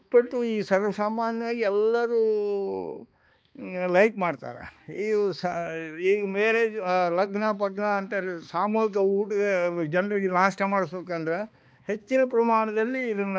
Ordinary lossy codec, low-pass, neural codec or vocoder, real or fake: none; none; codec, 16 kHz, 2 kbps, X-Codec, HuBERT features, trained on balanced general audio; fake